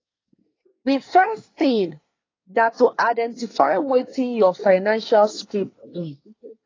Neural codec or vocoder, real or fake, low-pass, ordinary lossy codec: codec, 24 kHz, 1 kbps, SNAC; fake; 7.2 kHz; AAC, 32 kbps